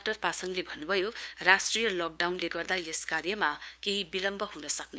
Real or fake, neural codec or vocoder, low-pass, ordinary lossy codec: fake; codec, 16 kHz, 2 kbps, FunCodec, trained on LibriTTS, 25 frames a second; none; none